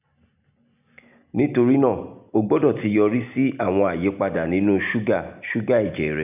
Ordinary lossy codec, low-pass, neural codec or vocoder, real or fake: none; 3.6 kHz; none; real